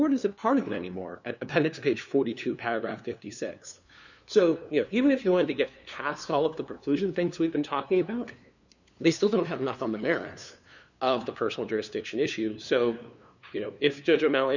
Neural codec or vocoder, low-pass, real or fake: codec, 16 kHz, 2 kbps, FunCodec, trained on LibriTTS, 25 frames a second; 7.2 kHz; fake